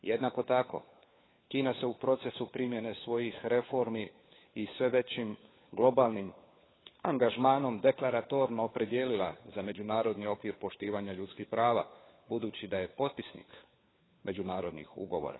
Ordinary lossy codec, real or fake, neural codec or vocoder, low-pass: AAC, 16 kbps; fake; codec, 16 kHz, 4 kbps, FunCodec, trained on LibriTTS, 50 frames a second; 7.2 kHz